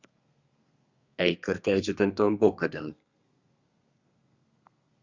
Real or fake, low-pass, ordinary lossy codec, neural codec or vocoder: fake; 7.2 kHz; Opus, 64 kbps; codec, 32 kHz, 1.9 kbps, SNAC